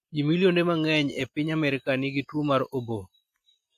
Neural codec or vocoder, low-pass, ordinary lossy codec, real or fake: none; 14.4 kHz; AAC, 64 kbps; real